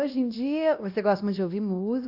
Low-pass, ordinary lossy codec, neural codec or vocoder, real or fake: 5.4 kHz; AAC, 48 kbps; codec, 16 kHz, 1 kbps, X-Codec, WavLM features, trained on Multilingual LibriSpeech; fake